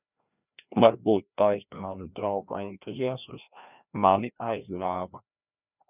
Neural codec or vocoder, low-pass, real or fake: codec, 16 kHz, 1 kbps, FreqCodec, larger model; 3.6 kHz; fake